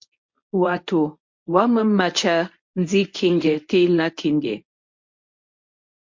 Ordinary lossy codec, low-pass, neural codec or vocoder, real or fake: MP3, 48 kbps; 7.2 kHz; codec, 24 kHz, 0.9 kbps, WavTokenizer, medium speech release version 1; fake